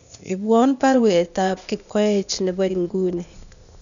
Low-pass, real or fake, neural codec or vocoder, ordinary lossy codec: 7.2 kHz; fake; codec, 16 kHz, 0.8 kbps, ZipCodec; none